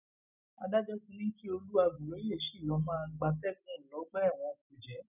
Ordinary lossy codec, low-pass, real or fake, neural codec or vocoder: none; 3.6 kHz; real; none